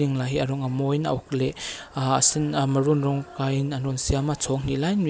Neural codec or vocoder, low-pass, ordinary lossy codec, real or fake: none; none; none; real